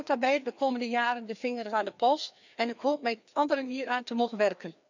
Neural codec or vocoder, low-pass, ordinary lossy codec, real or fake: codec, 16 kHz, 2 kbps, FreqCodec, larger model; 7.2 kHz; none; fake